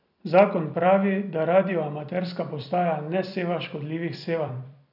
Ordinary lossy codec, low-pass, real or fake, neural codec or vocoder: none; 5.4 kHz; fake; vocoder, 44.1 kHz, 128 mel bands every 256 samples, BigVGAN v2